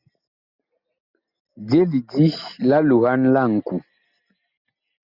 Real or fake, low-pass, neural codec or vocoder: real; 5.4 kHz; none